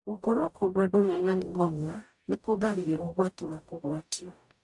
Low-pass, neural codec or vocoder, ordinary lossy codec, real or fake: 10.8 kHz; codec, 44.1 kHz, 0.9 kbps, DAC; AAC, 64 kbps; fake